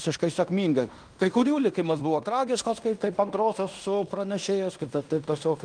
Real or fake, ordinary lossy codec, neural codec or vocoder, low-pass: fake; Opus, 32 kbps; codec, 16 kHz in and 24 kHz out, 0.9 kbps, LongCat-Audio-Codec, fine tuned four codebook decoder; 9.9 kHz